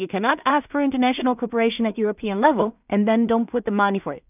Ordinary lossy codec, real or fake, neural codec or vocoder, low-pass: none; fake; codec, 16 kHz in and 24 kHz out, 0.4 kbps, LongCat-Audio-Codec, two codebook decoder; 3.6 kHz